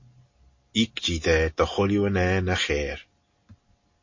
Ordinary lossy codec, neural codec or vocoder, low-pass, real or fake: MP3, 32 kbps; none; 7.2 kHz; real